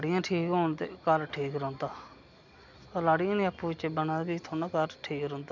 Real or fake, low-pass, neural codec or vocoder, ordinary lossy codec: real; 7.2 kHz; none; none